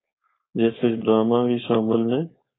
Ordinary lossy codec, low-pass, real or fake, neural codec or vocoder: AAC, 16 kbps; 7.2 kHz; fake; codec, 16 kHz, 4.8 kbps, FACodec